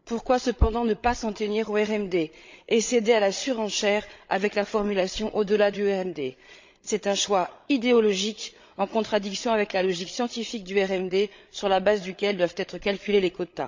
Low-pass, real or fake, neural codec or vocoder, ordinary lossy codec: 7.2 kHz; fake; codec, 16 kHz, 8 kbps, FreqCodec, larger model; MP3, 64 kbps